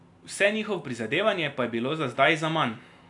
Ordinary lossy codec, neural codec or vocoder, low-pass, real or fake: AAC, 64 kbps; none; 10.8 kHz; real